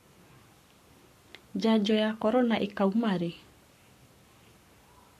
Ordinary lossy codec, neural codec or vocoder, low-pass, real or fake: AAC, 96 kbps; codec, 44.1 kHz, 7.8 kbps, Pupu-Codec; 14.4 kHz; fake